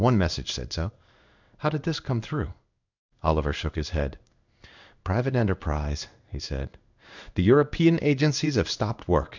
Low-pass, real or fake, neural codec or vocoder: 7.2 kHz; fake; codec, 16 kHz in and 24 kHz out, 1 kbps, XY-Tokenizer